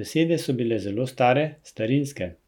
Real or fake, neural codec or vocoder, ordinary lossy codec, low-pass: real; none; none; 19.8 kHz